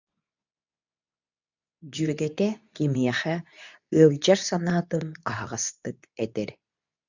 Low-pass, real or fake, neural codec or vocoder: 7.2 kHz; fake; codec, 24 kHz, 0.9 kbps, WavTokenizer, medium speech release version 2